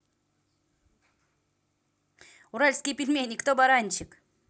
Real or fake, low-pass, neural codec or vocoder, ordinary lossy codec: real; none; none; none